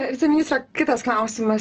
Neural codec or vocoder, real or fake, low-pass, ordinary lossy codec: none; real; 7.2 kHz; Opus, 16 kbps